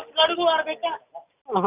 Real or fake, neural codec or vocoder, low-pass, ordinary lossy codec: real; none; 3.6 kHz; Opus, 64 kbps